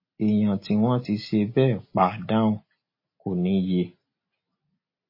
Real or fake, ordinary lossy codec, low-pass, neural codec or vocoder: real; MP3, 24 kbps; 5.4 kHz; none